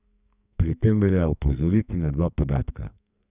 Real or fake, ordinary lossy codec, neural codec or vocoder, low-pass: fake; none; codec, 44.1 kHz, 2.6 kbps, SNAC; 3.6 kHz